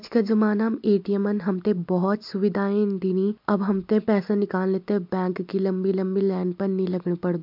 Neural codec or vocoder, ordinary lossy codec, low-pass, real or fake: none; MP3, 48 kbps; 5.4 kHz; real